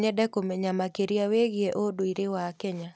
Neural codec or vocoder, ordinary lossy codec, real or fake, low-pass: none; none; real; none